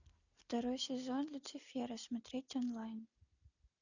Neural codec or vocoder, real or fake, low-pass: none; real; 7.2 kHz